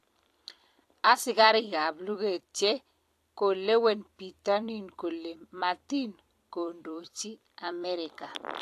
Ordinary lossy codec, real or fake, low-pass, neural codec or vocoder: AAC, 64 kbps; fake; 14.4 kHz; vocoder, 44.1 kHz, 128 mel bands every 256 samples, BigVGAN v2